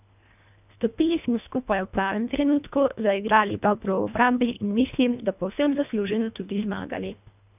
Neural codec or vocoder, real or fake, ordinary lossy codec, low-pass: codec, 24 kHz, 1.5 kbps, HILCodec; fake; none; 3.6 kHz